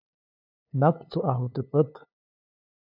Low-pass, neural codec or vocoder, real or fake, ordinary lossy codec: 5.4 kHz; codec, 16 kHz, 2 kbps, FunCodec, trained on LibriTTS, 25 frames a second; fake; AAC, 32 kbps